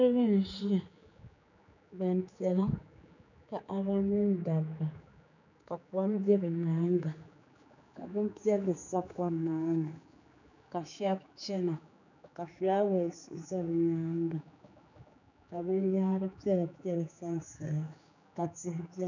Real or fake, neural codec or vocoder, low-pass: fake; codec, 16 kHz, 4 kbps, X-Codec, HuBERT features, trained on general audio; 7.2 kHz